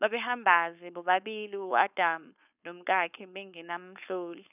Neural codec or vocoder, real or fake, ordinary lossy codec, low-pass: codec, 16 kHz, 4.8 kbps, FACodec; fake; none; 3.6 kHz